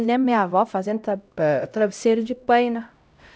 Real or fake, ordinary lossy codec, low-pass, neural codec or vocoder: fake; none; none; codec, 16 kHz, 0.5 kbps, X-Codec, HuBERT features, trained on LibriSpeech